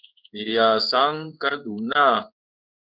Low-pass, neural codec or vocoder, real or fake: 5.4 kHz; codec, 16 kHz in and 24 kHz out, 1 kbps, XY-Tokenizer; fake